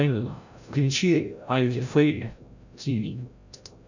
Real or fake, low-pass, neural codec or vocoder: fake; 7.2 kHz; codec, 16 kHz, 0.5 kbps, FreqCodec, larger model